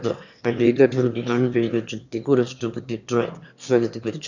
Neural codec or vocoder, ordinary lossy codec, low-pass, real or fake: autoencoder, 22.05 kHz, a latent of 192 numbers a frame, VITS, trained on one speaker; none; 7.2 kHz; fake